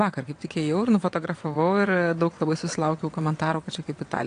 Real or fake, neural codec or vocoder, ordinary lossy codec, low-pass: fake; vocoder, 22.05 kHz, 80 mel bands, WaveNeXt; AAC, 48 kbps; 9.9 kHz